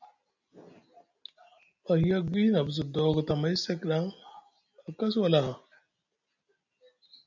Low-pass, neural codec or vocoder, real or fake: 7.2 kHz; none; real